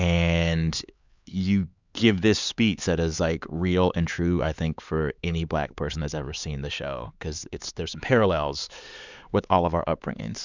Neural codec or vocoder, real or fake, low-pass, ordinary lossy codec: codec, 16 kHz, 4 kbps, X-Codec, HuBERT features, trained on LibriSpeech; fake; 7.2 kHz; Opus, 64 kbps